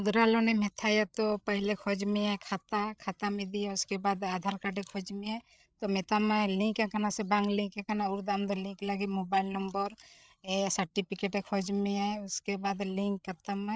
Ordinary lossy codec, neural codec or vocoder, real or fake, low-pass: none; codec, 16 kHz, 8 kbps, FreqCodec, larger model; fake; none